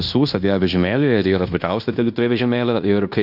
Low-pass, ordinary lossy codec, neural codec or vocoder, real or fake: 5.4 kHz; AAC, 48 kbps; codec, 16 kHz in and 24 kHz out, 0.9 kbps, LongCat-Audio-Codec, fine tuned four codebook decoder; fake